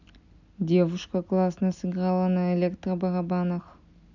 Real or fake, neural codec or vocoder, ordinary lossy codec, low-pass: real; none; none; 7.2 kHz